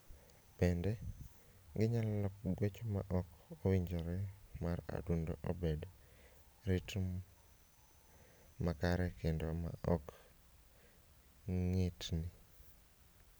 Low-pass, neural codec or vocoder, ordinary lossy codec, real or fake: none; none; none; real